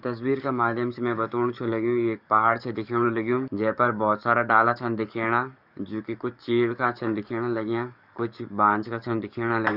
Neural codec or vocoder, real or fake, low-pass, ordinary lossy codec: codec, 44.1 kHz, 7.8 kbps, DAC; fake; 5.4 kHz; none